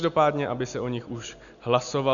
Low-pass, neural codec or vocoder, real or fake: 7.2 kHz; none; real